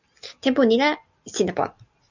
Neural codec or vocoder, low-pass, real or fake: none; 7.2 kHz; real